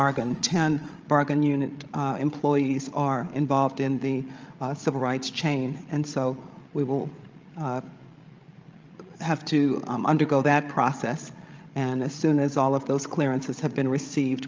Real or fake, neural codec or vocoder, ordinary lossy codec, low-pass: fake; codec, 16 kHz, 16 kbps, FreqCodec, larger model; Opus, 24 kbps; 7.2 kHz